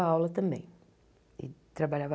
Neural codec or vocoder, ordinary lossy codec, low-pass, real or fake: none; none; none; real